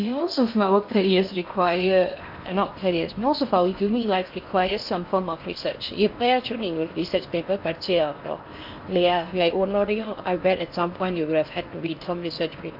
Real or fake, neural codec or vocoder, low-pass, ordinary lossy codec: fake; codec, 16 kHz in and 24 kHz out, 0.8 kbps, FocalCodec, streaming, 65536 codes; 5.4 kHz; MP3, 48 kbps